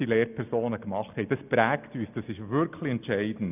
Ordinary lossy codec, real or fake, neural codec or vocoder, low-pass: none; real; none; 3.6 kHz